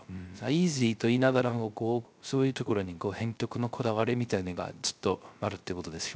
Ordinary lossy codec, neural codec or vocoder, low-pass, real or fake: none; codec, 16 kHz, 0.3 kbps, FocalCodec; none; fake